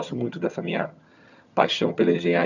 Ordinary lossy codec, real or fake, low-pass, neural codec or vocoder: none; fake; 7.2 kHz; vocoder, 22.05 kHz, 80 mel bands, HiFi-GAN